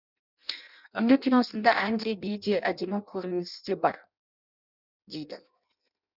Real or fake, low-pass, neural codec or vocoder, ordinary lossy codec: fake; 5.4 kHz; codec, 16 kHz in and 24 kHz out, 0.6 kbps, FireRedTTS-2 codec; none